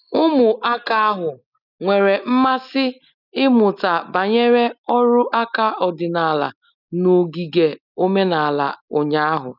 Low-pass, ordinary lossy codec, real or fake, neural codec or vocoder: 5.4 kHz; none; real; none